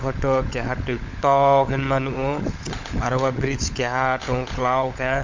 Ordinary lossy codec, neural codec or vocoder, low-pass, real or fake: MP3, 64 kbps; codec, 16 kHz, 16 kbps, FunCodec, trained on LibriTTS, 50 frames a second; 7.2 kHz; fake